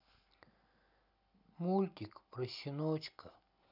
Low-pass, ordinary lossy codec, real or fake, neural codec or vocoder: 5.4 kHz; none; real; none